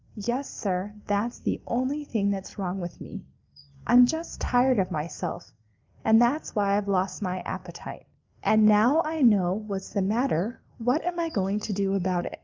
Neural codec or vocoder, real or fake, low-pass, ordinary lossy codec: none; real; 7.2 kHz; Opus, 32 kbps